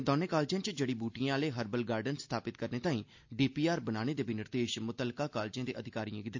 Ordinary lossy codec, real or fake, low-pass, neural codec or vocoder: MP3, 48 kbps; real; 7.2 kHz; none